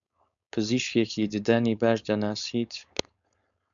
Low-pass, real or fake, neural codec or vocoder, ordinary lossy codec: 7.2 kHz; fake; codec, 16 kHz, 4.8 kbps, FACodec; MP3, 96 kbps